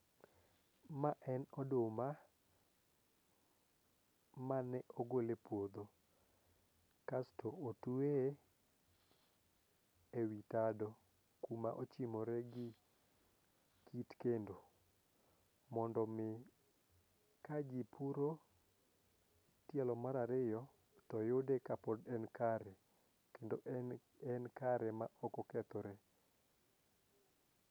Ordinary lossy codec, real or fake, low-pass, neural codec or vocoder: none; real; none; none